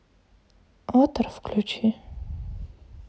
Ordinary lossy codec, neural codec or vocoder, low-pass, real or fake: none; none; none; real